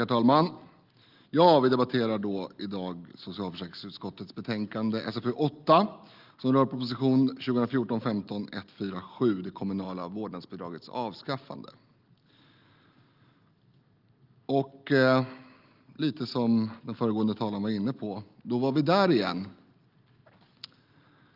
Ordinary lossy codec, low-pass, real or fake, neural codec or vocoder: Opus, 32 kbps; 5.4 kHz; real; none